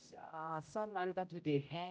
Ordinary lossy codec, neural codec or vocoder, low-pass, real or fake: none; codec, 16 kHz, 0.5 kbps, X-Codec, HuBERT features, trained on general audio; none; fake